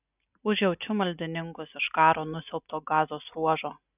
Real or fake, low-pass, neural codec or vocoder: real; 3.6 kHz; none